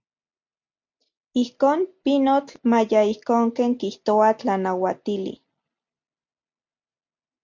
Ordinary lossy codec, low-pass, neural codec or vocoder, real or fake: MP3, 64 kbps; 7.2 kHz; none; real